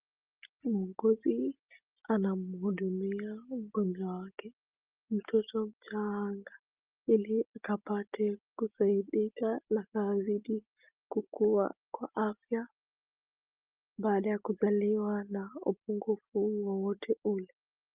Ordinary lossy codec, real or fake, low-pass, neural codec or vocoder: Opus, 32 kbps; real; 3.6 kHz; none